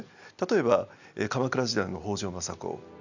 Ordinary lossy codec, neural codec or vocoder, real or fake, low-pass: none; none; real; 7.2 kHz